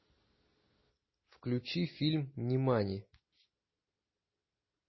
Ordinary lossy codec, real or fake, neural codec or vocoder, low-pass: MP3, 24 kbps; real; none; 7.2 kHz